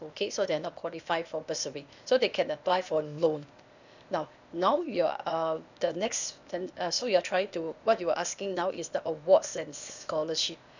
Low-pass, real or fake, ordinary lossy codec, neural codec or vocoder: 7.2 kHz; fake; none; codec, 16 kHz, 0.8 kbps, ZipCodec